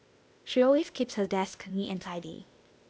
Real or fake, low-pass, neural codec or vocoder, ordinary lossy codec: fake; none; codec, 16 kHz, 0.8 kbps, ZipCodec; none